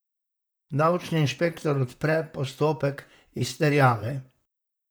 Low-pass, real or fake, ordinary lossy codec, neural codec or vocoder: none; fake; none; vocoder, 44.1 kHz, 128 mel bands, Pupu-Vocoder